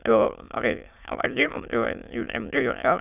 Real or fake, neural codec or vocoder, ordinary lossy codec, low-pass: fake; autoencoder, 22.05 kHz, a latent of 192 numbers a frame, VITS, trained on many speakers; none; 3.6 kHz